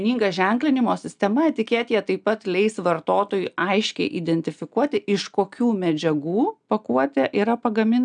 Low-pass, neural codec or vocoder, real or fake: 9.9 kHz; none; real